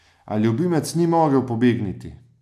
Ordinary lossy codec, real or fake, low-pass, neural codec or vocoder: none; real; 14.4 kHz; none